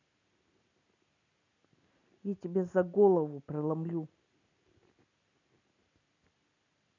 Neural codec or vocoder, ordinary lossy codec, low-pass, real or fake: none; none; 7.2 kHz; real